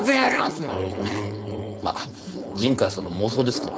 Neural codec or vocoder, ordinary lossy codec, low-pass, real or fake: codec, 16 kHz, 4.8 kbps, FACodec; none; none; fake